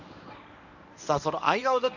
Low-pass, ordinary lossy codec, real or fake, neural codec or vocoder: 7.2 kHz; none; fake; codec, 24 kHz, 0.9 kbps, WavTokenizer, medium speech release version 1